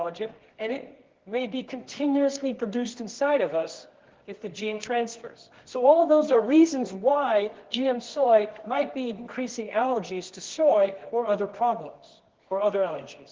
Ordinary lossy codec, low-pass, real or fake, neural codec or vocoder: Opus, 16 kbps; 7.2 kHz; fake; codec, 24 kHz, 0.9 kbps, WavTokenizer, medium music audio release